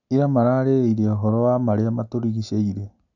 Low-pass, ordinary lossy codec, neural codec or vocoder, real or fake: 7.2 kHz; none; none; real